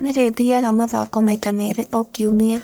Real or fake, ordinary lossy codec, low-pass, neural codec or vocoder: fake; none; none; codec, 44.1 kHz, 1.7 kbps, Pupu-Codec